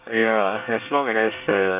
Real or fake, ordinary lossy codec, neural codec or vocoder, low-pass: fake; none; codec, 24 kHz, 1 kbps, SNAC; 3.6 kHz